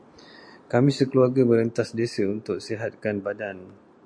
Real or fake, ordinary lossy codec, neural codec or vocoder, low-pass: real; MP3, 64 kbps; none; 9.9 kHz